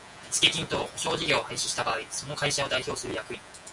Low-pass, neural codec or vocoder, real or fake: 10.8 kHz; vocoder, 48 kHz, 128 mel bands, Vocos; fake